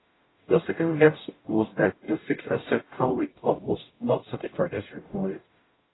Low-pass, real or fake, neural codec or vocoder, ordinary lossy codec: 7.2 kHz; fake; codec, 44.1 kHz, 0.9 kbps, DAC; AAC, 16 kbps